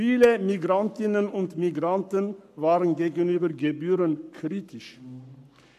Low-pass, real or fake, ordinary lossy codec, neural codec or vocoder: 14.4 kHz; fake; none; codec, 44.1 kHz, 7.8 kbps, Pupu-Codec